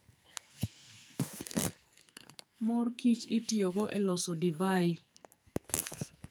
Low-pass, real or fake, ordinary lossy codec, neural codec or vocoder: none; fake; none; codec, 44.1 kHz, 2.6 kbps, SNAC